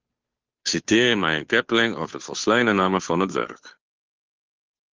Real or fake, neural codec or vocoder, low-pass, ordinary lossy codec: fake; codec, 16 kHz, 2 kbps, FunCodec, trained on Chinese and English, 25 frames a second; 7.2 kHz; Opus, 32 kbps